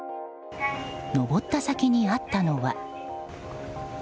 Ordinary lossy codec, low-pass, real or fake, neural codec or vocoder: none; none; real; none